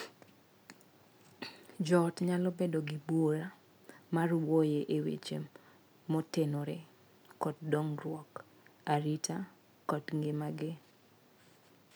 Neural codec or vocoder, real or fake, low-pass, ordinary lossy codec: none; real; none; none